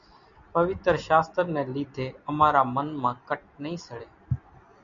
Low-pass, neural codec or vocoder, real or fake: 7.2 kHz; none; real